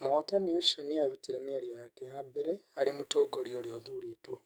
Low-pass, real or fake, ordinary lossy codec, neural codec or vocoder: none; fake; none; codec, 44.1 kHz, 2.6 kbps, SNAC